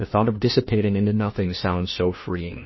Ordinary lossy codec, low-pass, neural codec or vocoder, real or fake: MP3, 24 kbps; 7.2 kHz; codec, 16 kHz, 1 kbps, FunCodec, trained on LibriTTS, 50 frames a second; fake